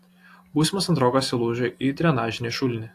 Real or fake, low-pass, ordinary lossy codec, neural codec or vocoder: real; 14.4 kHz; AAC, 64 kbps; none